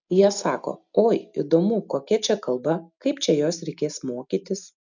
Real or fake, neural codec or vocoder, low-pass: real; none; 7.2 kHz